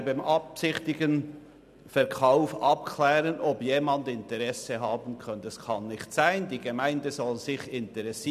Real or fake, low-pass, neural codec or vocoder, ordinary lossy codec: real; 14.4 kHz; none; none